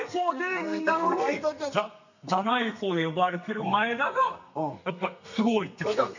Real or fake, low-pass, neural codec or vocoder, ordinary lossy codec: fake; 7.2 kHz; codec, 32 kHz, 1.9 kbps, SNAC; none